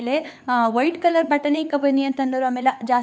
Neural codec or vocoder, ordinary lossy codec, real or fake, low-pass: codec, 16 kHz, 4 kbps, X-Codec, HuBERT features, trained on LibriSpeech; none; fake; none